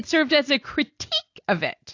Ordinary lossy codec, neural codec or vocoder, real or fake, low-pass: AAC, 48 kbps; none; real; 7.2 kHz